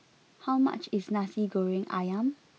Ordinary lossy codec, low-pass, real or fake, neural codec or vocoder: none; none; real; none